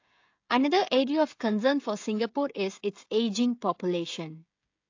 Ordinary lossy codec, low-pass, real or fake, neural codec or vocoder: AAC, 48 kbps; 7.2 kHz; fake; codec, 16 kHz, 16 kbps, FreqCodec, smaller model